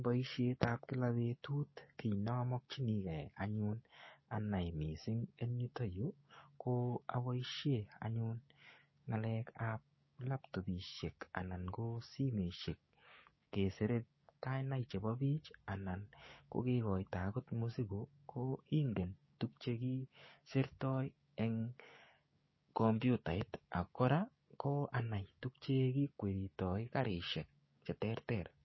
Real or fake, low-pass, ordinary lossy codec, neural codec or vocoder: fake; 7.2 kHz; MP3, 24 kbps; codec, 16 kHz, 6 kbps, DAC